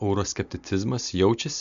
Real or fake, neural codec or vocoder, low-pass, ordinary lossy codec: real; none; 7.2 kHz; MP3, 64 kbps